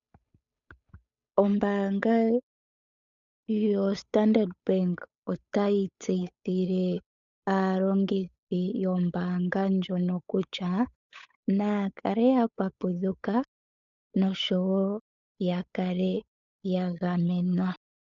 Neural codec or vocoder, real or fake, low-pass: codec, 16 kHz, 8 kbps, FunCodec, trained on Chinese and English, 25 frames a second; fake; 7.2 kHz